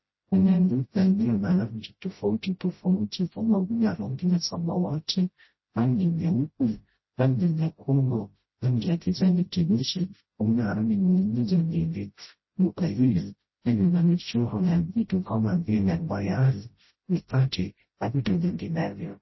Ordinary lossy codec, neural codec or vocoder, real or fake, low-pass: MP3, 24 kbps; codec, 16 kHz, 0.5 kbps, FreqCodec, smaller model; fake; 7.2 kHz